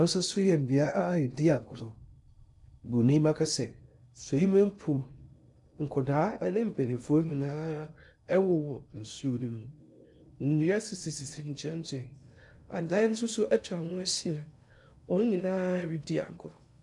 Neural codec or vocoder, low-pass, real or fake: codec, 16 kHz in and 24 kHz out, 0.6 kbps, FocalCodec, streaming, 2048 codes; 10.8 kHz; fake